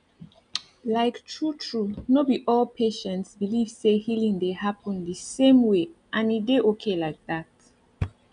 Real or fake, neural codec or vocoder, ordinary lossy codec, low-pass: real; none; none; 9.9 kHz